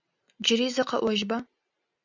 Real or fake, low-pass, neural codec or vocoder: real; 7.2 kHz; none